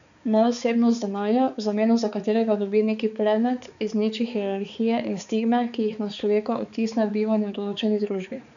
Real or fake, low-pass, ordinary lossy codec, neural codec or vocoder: fake; 7.2 kHz; none; codec, 16 kHz, 4 kbps, X-Codec, HuBERT features, trained on balanced general audio